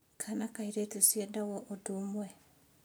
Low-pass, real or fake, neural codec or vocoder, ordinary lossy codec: none; real; none; none